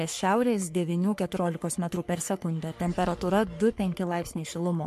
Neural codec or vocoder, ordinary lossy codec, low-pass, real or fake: codec, 44.1 kHz, 3.4 kbps, Pupu-Codec; MP3, 64 kbps; 14.4 kHz; fake